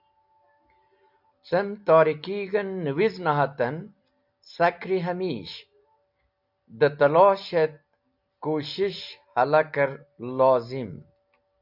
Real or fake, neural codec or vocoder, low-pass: real; none; 5.4 kHz